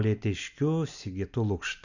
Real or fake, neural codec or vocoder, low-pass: real; none; 7.2 kHz